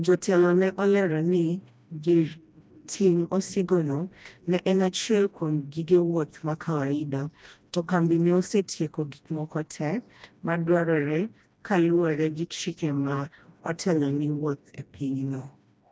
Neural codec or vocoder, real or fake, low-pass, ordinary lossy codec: codec, 16 kHz, 1 kbps, FreqCodec, smaller model; fake; none; none